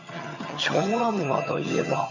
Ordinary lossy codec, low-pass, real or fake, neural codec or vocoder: none; 7.2 kHz; fake; vocoder, 22.05 kHz, 80 mel bands, HiFi-GAN